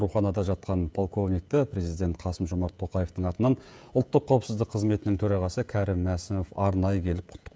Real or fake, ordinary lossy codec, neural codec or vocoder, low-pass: fake; none; codec, 16 kHz, 16 kbps, FreqCodec, smaller model; none